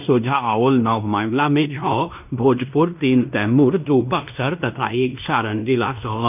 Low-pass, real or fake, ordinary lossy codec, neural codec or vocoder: 3.6 kHz; fake; none; codec, 16 kHz in and 24 kHz out, 0.9 kbps, LongCat-Audio-Codec, fine tuned four codebook decoder